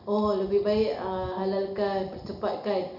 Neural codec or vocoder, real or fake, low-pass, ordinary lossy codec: none; real; 5.4 kHz; none